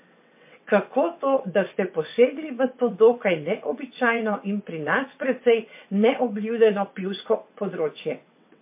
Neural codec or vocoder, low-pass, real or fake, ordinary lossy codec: vocoder, 22.05 kHz, 80 mel bands, WaveNeXt; 3.6 kHz; fake; MP3, 24 kbps